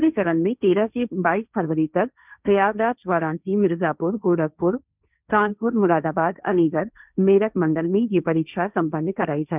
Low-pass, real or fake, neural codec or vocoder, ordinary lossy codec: 3.6 kHz; fake; codec, 16 kHz, 1.1 kbps, Voila-Tokenizer; none